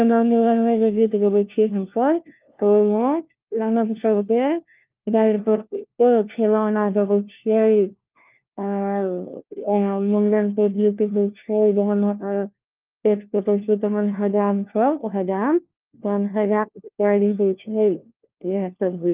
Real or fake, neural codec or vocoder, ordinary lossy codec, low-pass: fake; codec, 16 kHz, 1 kbps, FunCodec, trained on LibriTTS, 50 frames a second; Opus, 24 kbps; 3.6 kHz